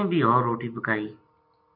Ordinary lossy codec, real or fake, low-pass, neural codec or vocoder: AAC, 48 kbps; real; 5.4 kHz; none